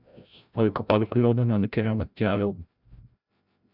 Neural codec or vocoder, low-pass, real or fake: codec, 16 kHz, 0.5 kbps, FreqCodec, larger model; 5.4 kHz; fake